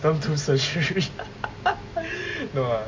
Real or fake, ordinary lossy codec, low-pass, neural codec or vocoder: real; MP3, 48 kbps; 7.2 kHz; none